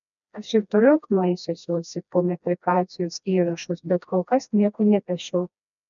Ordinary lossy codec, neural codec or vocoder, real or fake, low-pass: MP3, 96 kbps; codec, 16 kHz, 1 kbps, FreqCodec, smaller model; fake; 7.2 kHz